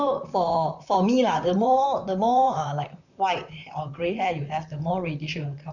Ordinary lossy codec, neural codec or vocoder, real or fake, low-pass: none; vocoder, 44.1 kHz, 128 mel bands, Pupu-Vocoder; fake; 7.2 kHz